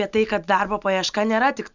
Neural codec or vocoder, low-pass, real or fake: none; 7.2 kHz; real